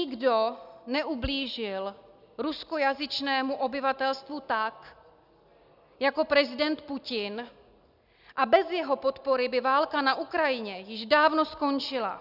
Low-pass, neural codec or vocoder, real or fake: 5.4 kHz; none; real